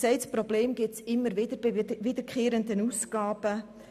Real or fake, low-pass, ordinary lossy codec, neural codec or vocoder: real; 14.4 kHz; none; none